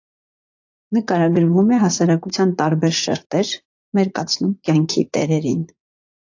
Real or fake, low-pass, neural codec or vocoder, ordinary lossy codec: fake; 7.2 kHz; vocoder, 44.1 kHz, 80 mel bands, Vocos; AAC, 48 kbps